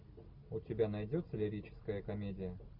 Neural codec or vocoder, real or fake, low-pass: none; real; 5.4 kHz